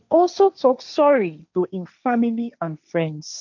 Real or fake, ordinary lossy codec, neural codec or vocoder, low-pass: fake; none; codec, 16 kHz, 1.1 kbps, Voila-Tokenizer; 7.2 kHz